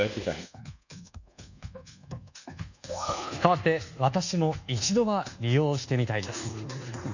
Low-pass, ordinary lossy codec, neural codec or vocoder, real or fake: 7.2 kHz; none; codec, 24 kHz, 1.2 kbps, DualCodec; fake